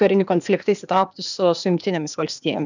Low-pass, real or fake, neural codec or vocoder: 7.2 kHz; fake; codec, 16 kHz, 0.8 kbps, ZipCodec